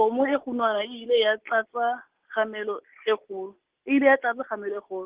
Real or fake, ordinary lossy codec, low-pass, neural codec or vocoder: real; Opus, 16 kbps; 3.6 kHz; none